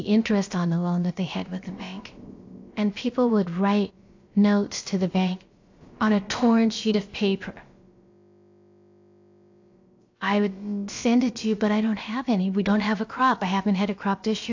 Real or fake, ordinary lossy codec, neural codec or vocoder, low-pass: fake; AAC, 48 kbps; codec, 16 kHz, about 1 kbps, DyCAST, with the encoder's durations; 7.2 kHz